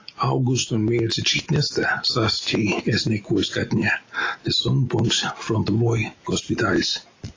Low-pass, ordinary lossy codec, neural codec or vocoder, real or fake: 7.2 kHz; AAC, 32 kbps; none; real